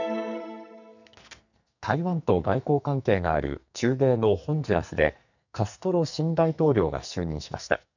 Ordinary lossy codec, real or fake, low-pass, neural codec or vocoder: none; fake; 7.2 kHz; codec, 44.1 kHz, 2.6 kbps, SNAC